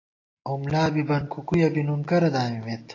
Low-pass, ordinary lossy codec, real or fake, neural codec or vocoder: 7.2 kHz; AAC, 32 kbps; real; none